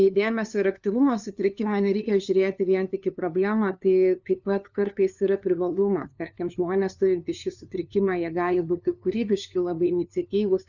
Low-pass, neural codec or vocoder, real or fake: 7.2 kHz; codec, 16 kHz, 2 kbps, FunCodec, trained on LibriTTS, 25 frames a second; fake